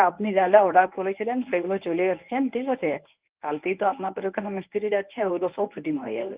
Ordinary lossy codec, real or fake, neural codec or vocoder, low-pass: Opus, 32 kbps; fake; codec, 24 kHz, 0.9 kbps, WavTokenizer, medium speech release version 1; 3.6 kHz